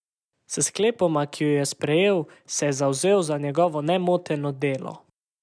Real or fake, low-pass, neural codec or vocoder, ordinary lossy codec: real; none; none; none